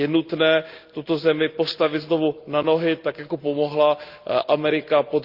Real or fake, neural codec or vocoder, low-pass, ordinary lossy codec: real; none; 5.4 kHz; Opus, 32 kbps